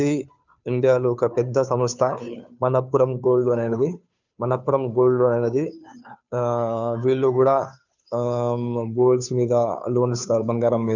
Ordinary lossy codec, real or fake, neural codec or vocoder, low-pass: none; fake; codec, 16 kHz, 2 kbps, FunCodec, trained on Chinese and English, 25 frames a second; 7.2 kHz